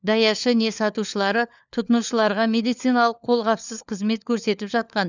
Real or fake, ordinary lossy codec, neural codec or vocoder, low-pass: fake; none; codec, 16 kHz, 4 kbps, FunCodec, trained on LibriTTS, 50 frames a second; 7.2 kHz